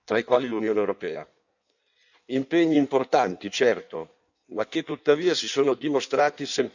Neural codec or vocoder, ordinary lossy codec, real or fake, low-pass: codec, 16 kHz in and 24 kHz out, 1.1 kbps, FireRedTTS-2 codec; none; fake; 7.2 kHz